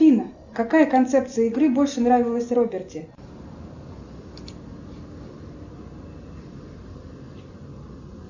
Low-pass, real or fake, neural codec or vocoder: 7.2 kHz; real; none